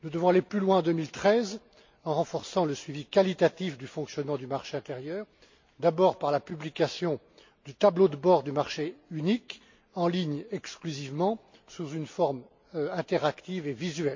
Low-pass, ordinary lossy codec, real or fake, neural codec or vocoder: 7.2 kHz; MP3, 48 kbps; real; none